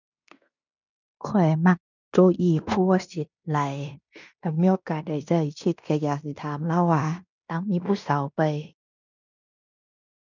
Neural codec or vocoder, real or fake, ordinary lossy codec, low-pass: codec, 16 kHz in and 24 kHz out, 0.9 kbps, LongCat-Audio-Codec, fine tuned four codebook decoder; fake; none; 7.2 kHz